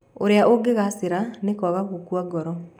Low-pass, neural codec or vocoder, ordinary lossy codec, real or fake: 19.8 kHz; none; none; real